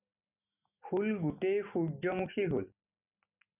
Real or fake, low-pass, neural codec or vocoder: real; 3.6 kHz; none